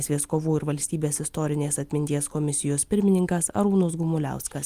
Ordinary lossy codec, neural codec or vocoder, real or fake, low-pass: Opus, 32 kbps; none; real; 14.4 kHz